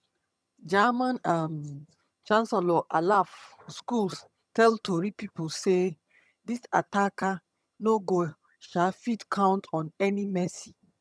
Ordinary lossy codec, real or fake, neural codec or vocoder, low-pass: none; fake; vocoder, 22.05 kHz, 80 mel bands, HiFi-GAN; none